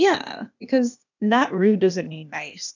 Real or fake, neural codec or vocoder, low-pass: fake; codec, 16 kHz, 0.8 kbps, ZipCodec; 7.2 kHz